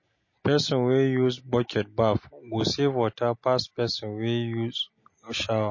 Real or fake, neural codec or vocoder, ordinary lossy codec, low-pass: real; none; MP3, 32 kbps; 7.2 kHz